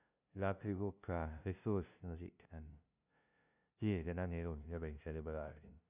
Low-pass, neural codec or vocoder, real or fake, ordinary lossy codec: 3.6 kHz; codec, 16 kHz, 0.5 kbps, FunCodec, trained on LibriTTS, 25 frames a second; fake; none